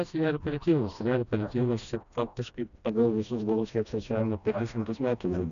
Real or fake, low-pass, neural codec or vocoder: fake; 7.2 kHz; codec, 16 kHz, 1 kbps, FreqCodec, smaller model